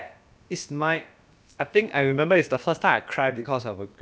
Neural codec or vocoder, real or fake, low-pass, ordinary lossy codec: codec, 16 kHz, about 1 kbps, DyCAST, with the encoder's durations; fake; none; none